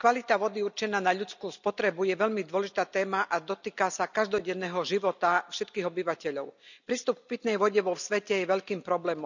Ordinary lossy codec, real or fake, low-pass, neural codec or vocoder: none; real; 7.2 kHz; none